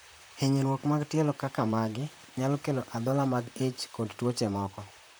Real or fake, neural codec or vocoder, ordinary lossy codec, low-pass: fake; vocoder, 44.1 kHz, 128 mel bands, Pupu-Vocoder; none; none